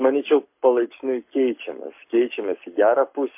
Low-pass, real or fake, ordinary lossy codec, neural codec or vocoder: 3.6 kHz; fake; MP3, 32 kbps; codec, 44.1 kHz, 7.8 kbps, Pupu-Codec